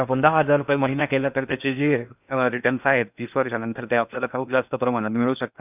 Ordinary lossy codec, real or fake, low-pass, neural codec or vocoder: none; fake; 3.6 kHz; codec, 16 kHz in and 24 kHz out, 0.6 kbps, FocalCodec, streaming, 4096 codes